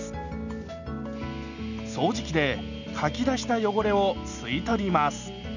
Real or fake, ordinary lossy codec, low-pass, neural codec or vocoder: real; none; 7.2 kHz; none